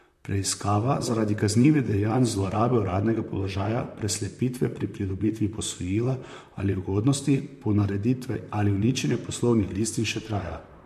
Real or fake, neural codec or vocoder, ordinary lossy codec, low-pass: fake; vocoder, 44.1 kHz, 128 mel bands, Pupu-Vocoder; MP3, 64 kbps; 14.4 kHz